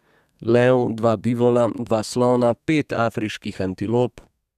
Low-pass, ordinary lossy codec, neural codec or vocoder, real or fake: 14.4 kHz; none; codec, 32 kHz, 1.9 kbps, SNAC; fake